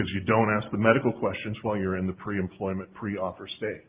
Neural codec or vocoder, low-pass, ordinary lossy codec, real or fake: none; 3.6 kHz; Opus, 32 kbps; real